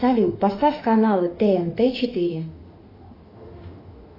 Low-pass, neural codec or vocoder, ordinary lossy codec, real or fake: 5.4 kHz; autoencoder, 48 kHz, 32 numbers a frame, DAC-VAE, trained on Japanese speech; AAC, 24 kbps; fake